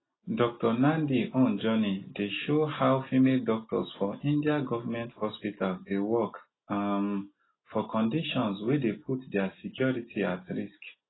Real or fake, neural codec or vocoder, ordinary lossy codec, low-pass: real; none; AAC, 16 kbps; 7.2 kHz